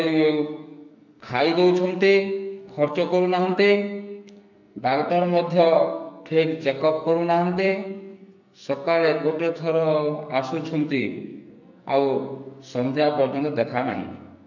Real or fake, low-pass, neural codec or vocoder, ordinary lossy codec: fake; 7.2 kHz; codec, 44.1 kHz, 2.6 kbps, SNAC; none